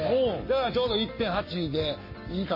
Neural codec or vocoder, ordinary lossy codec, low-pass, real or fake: codec, 44.1 kHz, 7.8 kbps, Pupu-Codec; MP3, 24 kbps; 5.4 kHz; fake